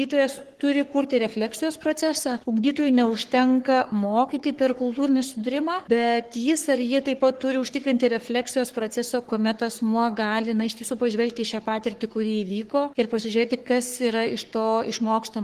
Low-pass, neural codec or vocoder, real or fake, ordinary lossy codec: 14.4 kHz; codec, 44.1 kHz, 3.4 kbps, Pupu-Codec; fake; Opus, 16 kbps